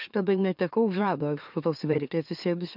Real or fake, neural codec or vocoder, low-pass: fake; autoencoder, 44.1 kHz, a latent of 192 numbers a frame, MeloTTS; 5.4 kHz